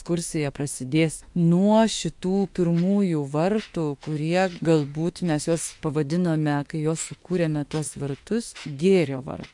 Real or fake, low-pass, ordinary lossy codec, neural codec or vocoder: fake; 10.8 kHz; AAC, 64 kbps; autoencoder, 48 kHz, 32 numbers a frame, DAC-VAE, trained on Japanese speech